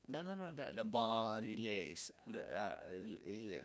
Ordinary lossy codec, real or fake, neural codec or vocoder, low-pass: none; fake; codec, 16 kHz, 1 kbps, FreqCodec, larger model; none